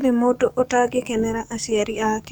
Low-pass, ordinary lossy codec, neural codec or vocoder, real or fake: none; none; codec, 44.1 kHz, 7.8 kbps, DAC; fake